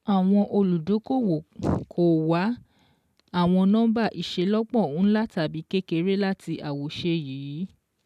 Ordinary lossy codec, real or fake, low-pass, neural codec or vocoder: none; real; 14.4 kHz; none